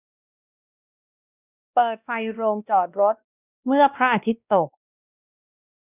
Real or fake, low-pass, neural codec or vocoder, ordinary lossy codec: fake; 3.6 kHz; codec, 16 kHz, 1 kbps, X-Codec, WavLM features, trained on Multilingual LibriSpeech; none